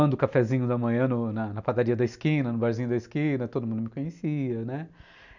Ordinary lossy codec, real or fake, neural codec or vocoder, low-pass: none; real; none; 7.2 kHz